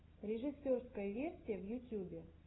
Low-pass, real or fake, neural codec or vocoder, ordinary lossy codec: 7.2 kHz; real; none; AAC, 16 kbps